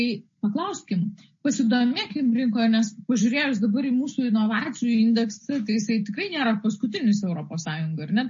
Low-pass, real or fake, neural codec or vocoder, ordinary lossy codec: 7.2 kHz; real; none; MP3, 32 kbps